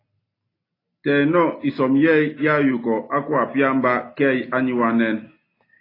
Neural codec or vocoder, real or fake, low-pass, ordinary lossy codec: none; real; 5.4 kHz; AAC, 24 kbps